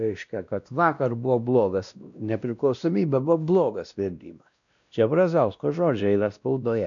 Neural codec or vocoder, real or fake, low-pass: codec, 16 kHz, 1 kbps, X-Codec, WavLM features, trained on Multilingual LibriSpeech; fake; 7.2 kHz